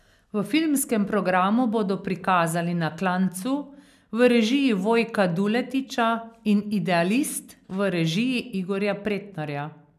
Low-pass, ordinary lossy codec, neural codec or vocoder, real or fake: 14.4 kHz; none; none; real